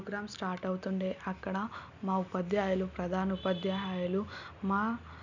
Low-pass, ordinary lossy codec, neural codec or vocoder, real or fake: 7.2 kHz; none; none; real